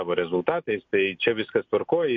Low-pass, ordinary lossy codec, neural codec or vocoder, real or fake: 7.2 kHz; MP3, 48 kbps; none; real